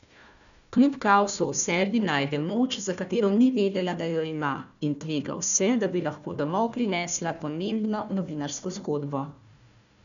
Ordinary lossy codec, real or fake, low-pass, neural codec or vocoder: none; fake; 7.2 kHz; codec, 16 kHz, 1 kbps, FunCodec, trained on Chinese and English, 50 frames a second